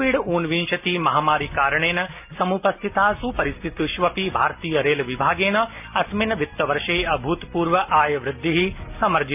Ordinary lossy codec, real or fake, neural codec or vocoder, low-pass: none; real; none; 3.6 kHz